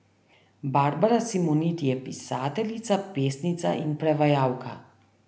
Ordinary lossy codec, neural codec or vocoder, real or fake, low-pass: none; none; real; none